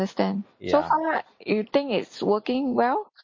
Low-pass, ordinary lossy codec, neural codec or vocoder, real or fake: 7.2 kHz; MP3, 32 kbps; none; real